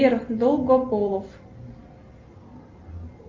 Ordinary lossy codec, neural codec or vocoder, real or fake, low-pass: Opus, 32 kbps; none; real; 7.2 kHz